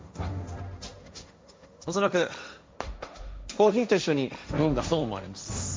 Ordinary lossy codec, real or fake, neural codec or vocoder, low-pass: none; fake; codec, 16 kHz, 1.1 kbps, Voila-Tokenizer; none